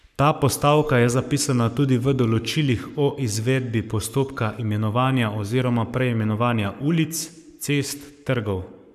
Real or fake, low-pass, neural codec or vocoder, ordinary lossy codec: fake; 14.4 kHz; codec, 44.1 kHz, 7.8 kbps, Pupu-Codec; none